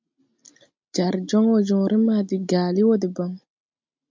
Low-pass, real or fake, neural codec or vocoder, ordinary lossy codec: 7.2 kHz; real; none; MP3, 64 kbps